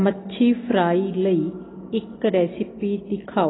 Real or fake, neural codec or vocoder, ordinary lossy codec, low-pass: real; none; AAC, 16 kbps; 7.2 kHz